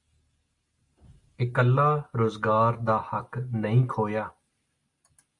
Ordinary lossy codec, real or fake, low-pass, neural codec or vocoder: Opus, 64 kbps; real; 10.8 kHz; none